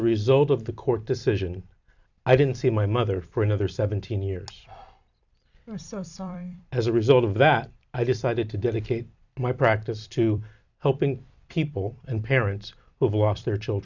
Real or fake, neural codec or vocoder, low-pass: fake; vocoder, 44.1 kHz, 128 mel bands every 256 samples, BigVGAN v2; 7.2 kHz